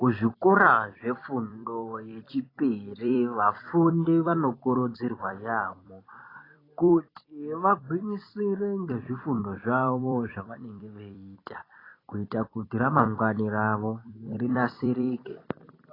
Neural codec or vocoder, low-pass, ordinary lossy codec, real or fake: vocoder, 44.1 kHz, 128 mel bands every 256 samples, BigVGAN v2; 5.4 kHz; AAC, 24 kbps; fake